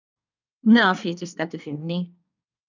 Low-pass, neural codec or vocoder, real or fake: 7.2 kHz; codec, 24 kHz, 1 kbps, SNAC; fake